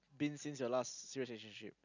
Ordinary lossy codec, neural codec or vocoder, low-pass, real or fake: none; none; 7.2 kHz; real